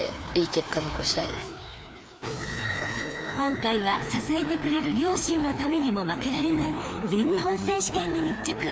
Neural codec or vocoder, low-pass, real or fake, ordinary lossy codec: codec, 16 kHz, 2 kbps, FreqCodec, larger model; none; fake; none